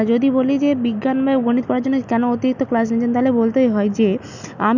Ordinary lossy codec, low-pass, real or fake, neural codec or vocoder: none; 7.2 kHz; real; none